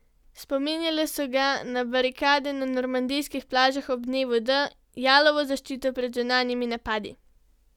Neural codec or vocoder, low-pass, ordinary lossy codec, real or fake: none; 19.8 kHz; none; real